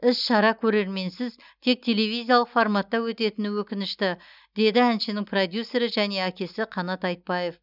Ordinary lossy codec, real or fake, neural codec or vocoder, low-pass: none; real; none; 5.4 kHz